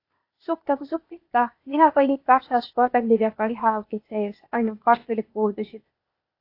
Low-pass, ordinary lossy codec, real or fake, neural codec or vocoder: 5.4 kHz; AAC, 32 kbps; fake; codec, 16 kHz, 0.8 kbps, ZipCodec